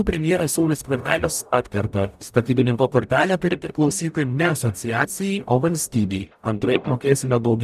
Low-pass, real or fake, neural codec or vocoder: 14.4 kHz; fake; codec, 44.1 kHz, 0.9 kbps, DAC